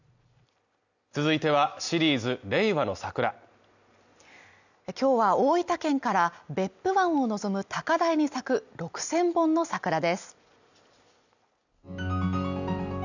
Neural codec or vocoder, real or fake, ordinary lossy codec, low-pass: none; real; none; 7.2 kHz